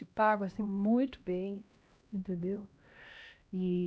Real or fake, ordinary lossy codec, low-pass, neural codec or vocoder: fake; none; none; codec, 16 kHz, 1 kbps, X-Codec, HuBERT features, trained on LibriSpeech